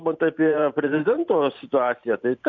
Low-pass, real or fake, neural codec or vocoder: 7.2 kHz; fake; vocoder, 44.1 kHz, 128 mel bands every 512 samples, BigVGAN v2